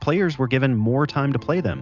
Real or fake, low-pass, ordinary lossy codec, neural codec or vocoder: real; 7.2 kHz; Opus, 64 kbps; none